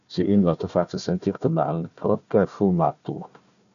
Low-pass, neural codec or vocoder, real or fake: 7.2 kHz; codec, 16 kHz, 1 kbps, FunCodec, trained on Chinese and English, 50 frames a second; fake